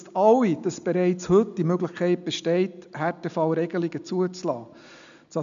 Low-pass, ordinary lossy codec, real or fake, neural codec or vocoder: 7.2 kHz; AAC, 96 kbps; real; none